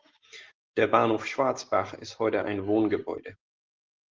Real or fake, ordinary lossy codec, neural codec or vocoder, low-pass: real; Opus, 16 kbps; none; 7.2 kHz